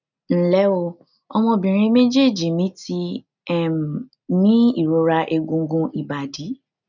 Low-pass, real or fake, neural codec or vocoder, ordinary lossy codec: 7.2 kHz; real; none; none